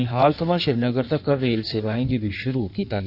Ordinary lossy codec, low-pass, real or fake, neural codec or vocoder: none; 5.4 kHz; fake; codec, 16 kHz in and 24 kHz out, 1.1 kbps, FireRedTTS-2 codec